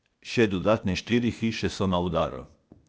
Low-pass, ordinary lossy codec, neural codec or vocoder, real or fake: none; none; codec, 16 kHz, 0.8 kbps, ZipCodec; fake